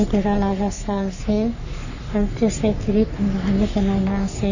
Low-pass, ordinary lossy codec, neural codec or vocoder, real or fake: 7.2 kHz; none; codec, 44.1 kHz, 3.4 kbps, Pupu-Codec; fake